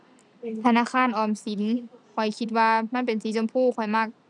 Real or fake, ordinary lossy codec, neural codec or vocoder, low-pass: real; none; none; 10.8 kHz